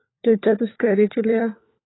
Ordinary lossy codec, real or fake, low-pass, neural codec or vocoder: AAC, 16 kbps; fake; 7.2 kHz; codec, 16 kHz, 4 kbps, FunCodec, trained on LibriTTS, 50 frames a second